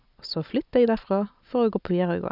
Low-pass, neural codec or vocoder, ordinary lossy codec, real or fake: 5.4 kHz; none; none; real